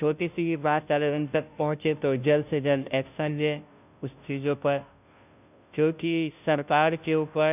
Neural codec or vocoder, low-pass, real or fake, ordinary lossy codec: codec, 16 kHz, 0.5 kbps, FunCodec, trained on Chinese and English, 25 frames a second; 3.6 kHz; fake; none